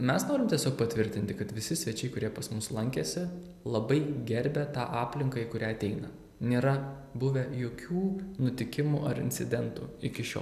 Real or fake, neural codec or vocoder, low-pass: real; none; 14.4 kHz